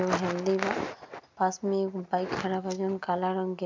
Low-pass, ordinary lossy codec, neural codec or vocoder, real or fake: 7.2 kHz; MP3, 64 kbps; none; real